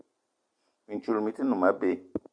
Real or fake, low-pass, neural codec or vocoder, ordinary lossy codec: fake; 9.9 kHz; vocoder, 44.1 kHz, 128 mel bands every 256 samples, BigVGAN v2; MP3, 64 kbps